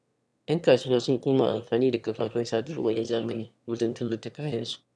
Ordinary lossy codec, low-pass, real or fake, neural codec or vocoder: none; none; fake; autoencoder, 22.05 kHz, a latent of 192 numbers a frame, VITS, trained on one speaker